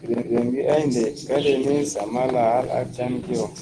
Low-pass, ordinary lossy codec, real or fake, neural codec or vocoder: 9.9 kHz; Opus, 16 kbps; real; none